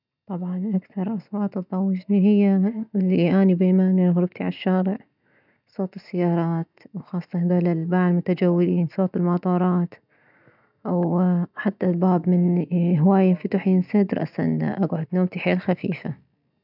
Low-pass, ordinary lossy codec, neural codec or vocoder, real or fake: 5.4 kHz; none; none; real